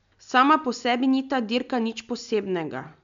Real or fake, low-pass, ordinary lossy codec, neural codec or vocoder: real; 7.2 kHz; MP3, 64 kbps; none